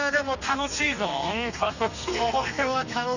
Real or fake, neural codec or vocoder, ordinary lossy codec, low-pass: fake; codec, 32 kHz, 1.9 kbps, SNAC; none; 7.2 kHz